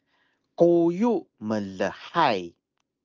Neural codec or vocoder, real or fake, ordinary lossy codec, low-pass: none; real; Opus, 24 kbps; 7.2 kHz